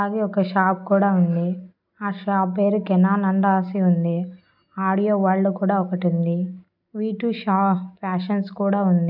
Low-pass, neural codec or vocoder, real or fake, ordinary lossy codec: 5.4 kHz; none; real; none